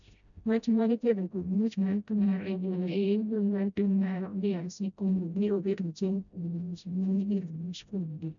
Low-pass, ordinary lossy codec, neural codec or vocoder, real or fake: 7.2 kHz; none; codec, 16 kHz, 0.5 kbps, FreqCodec, smaller model; fake